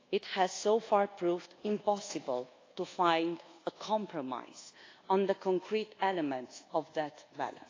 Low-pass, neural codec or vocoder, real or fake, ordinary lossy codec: 7.2 kHz; codec, 24 kHz, 1.2 kbps, DualCodec; fake; AAC, 32 kbps